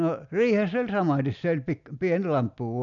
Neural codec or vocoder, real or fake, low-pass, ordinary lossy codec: none; real; 7.2 kHz; none